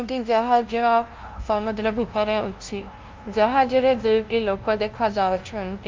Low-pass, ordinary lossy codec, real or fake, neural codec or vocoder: 7.2 kHz; Opus, 32 kbps; fake; codec, 16 kHz, 0.5 kbps, FunCodec, trained on LibriTTS, 25 frames a second